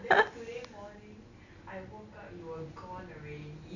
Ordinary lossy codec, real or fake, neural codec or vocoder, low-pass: none; real; none; 7.2 kHz